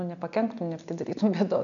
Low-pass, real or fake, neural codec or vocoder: 7.2 kHz; real; none